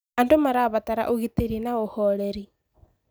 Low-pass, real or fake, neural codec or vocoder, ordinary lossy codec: none; real; none; none